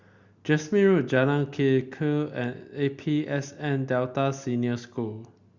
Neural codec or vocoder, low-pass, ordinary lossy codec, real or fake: none; 7.2 kHz; Opus, 64 kbps; real